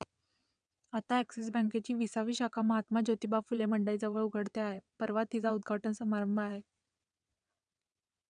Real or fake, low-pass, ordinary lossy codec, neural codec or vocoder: fake; 9.9 kHz; none; vocoder, 22.05 kHz, 80 mel bands, WaveNeXt